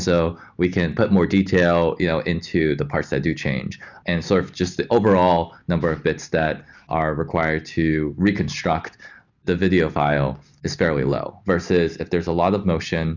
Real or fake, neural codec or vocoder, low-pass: real; none; 7.2 kHz